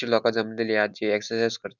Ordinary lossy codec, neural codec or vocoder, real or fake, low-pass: none; none; real; 7.2 kHz